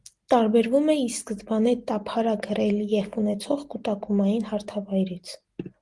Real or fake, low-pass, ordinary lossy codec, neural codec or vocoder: real; 10.8 kHz; Opus, 24 kbps; none